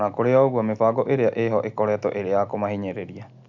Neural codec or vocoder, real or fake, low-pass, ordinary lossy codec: none; real; 7.2 kHz; none